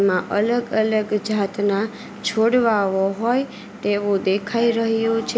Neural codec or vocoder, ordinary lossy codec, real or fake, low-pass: none; none; real; none